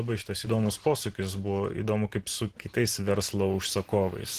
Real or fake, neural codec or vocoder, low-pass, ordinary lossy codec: real; none; 14.4 kHz; Opus, 16 kbps